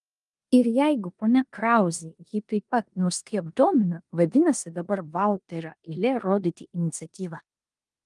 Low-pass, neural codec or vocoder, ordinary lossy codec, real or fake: 10.8 kHz; codec, 16 kHz in and 24 kHz out, 0.9 kbps, LongCat-Audio-Codec, four codebook decoder; Opus, 32 kbps; fake